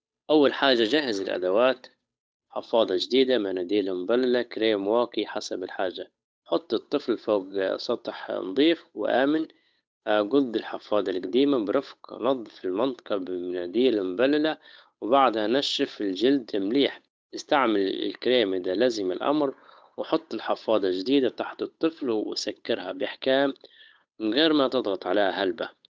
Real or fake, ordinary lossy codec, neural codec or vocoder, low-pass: fake; none; codec, 16 kHz, 8 kbps, FunCodec, trained on Chinese and English, 25 frames a second; none